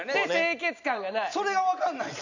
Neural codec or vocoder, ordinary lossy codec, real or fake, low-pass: none; none; real; 7.2 kHz